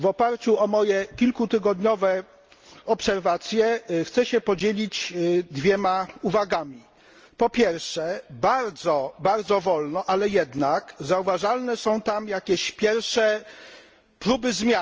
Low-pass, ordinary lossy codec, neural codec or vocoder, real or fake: 7.2 kHz; Opus, 24 kbps; none; real